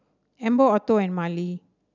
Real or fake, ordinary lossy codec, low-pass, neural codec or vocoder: real; none; 7.2 kHz; none